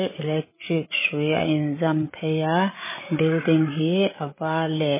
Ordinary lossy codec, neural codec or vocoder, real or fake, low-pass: MP3, 16 kbps; codec, 16 kHz, 8 kbps, FreqCodec, larger model; fake; 3.6 kHz